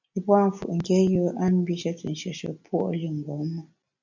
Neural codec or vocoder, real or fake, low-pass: none; real; 7.2 kHz